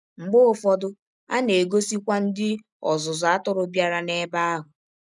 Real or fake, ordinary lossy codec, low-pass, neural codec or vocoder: real; none; 10.8 kHz; none